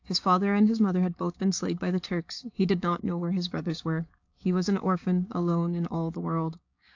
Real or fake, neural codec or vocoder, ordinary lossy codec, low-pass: fake; codec, 16 kHz, 6 kbps, DAC; AAC, 48 kbps; 7.2 kHz